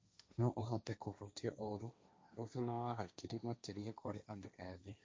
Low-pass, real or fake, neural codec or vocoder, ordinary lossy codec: none; fake; codec, 16 kHz, 1.1 kbps, Voila-Tokenizer; none